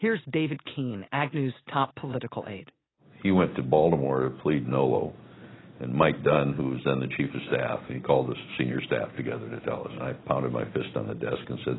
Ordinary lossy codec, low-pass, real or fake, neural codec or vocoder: AAC, 16 kbps; 7.2 kHz; real; none